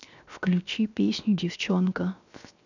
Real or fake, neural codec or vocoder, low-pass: fake; codec, 16 kHz, 0.7 kbps, FocalCodec; 7.2 kHz